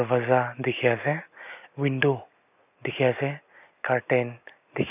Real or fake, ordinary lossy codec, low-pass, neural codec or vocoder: real; none; 3.6 kHz; none